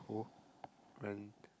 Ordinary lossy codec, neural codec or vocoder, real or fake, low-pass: none; none; real; none